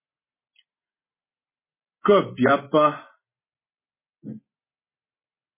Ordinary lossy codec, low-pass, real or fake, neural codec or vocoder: MP3, 16 kbps; 3.6 kHz; real; none